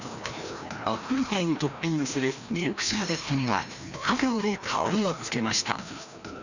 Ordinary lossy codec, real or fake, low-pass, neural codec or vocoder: none; fake; 7.2 kHz; codec, 16 kHz, 1 kbps, FreqCodec, larger model